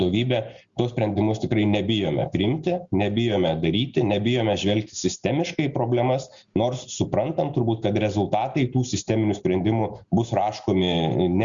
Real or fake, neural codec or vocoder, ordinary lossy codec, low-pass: real; none; Opus, 64 kbps; 7.2 kHz